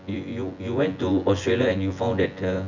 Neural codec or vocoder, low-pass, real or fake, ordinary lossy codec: vocoder, 24 kHz, 100 mel bands, Vocos; 7.2 kHz; fake; none